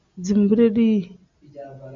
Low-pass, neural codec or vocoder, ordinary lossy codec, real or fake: 7.2 kHz; none; AAC, 64 kbps; real